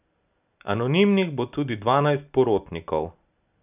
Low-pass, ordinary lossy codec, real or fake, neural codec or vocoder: 3.6 kHz; none; real; none